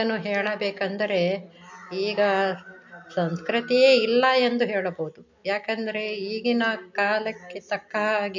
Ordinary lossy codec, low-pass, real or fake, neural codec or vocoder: MP3, 48 kbps; 7.2 kHz; real; none